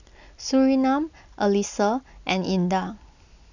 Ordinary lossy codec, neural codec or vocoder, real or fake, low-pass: none; none; real; 7.2 kHz